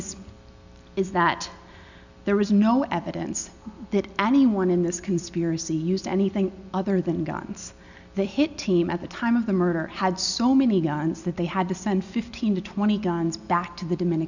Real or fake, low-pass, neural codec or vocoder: real; 7.2 kHz; none